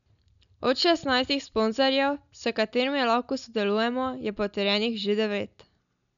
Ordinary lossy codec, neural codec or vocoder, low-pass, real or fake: none; none; 7.2 kHz; real